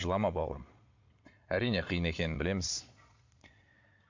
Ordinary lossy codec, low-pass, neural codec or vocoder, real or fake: MP3, 48 kbps; 7.2 kHz; vocoder, 22.05 kHz, 80 mel bands, Vocos; fake